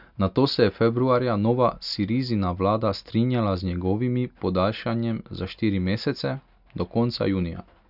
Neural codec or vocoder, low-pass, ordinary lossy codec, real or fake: none; 5.4 kHz; none; real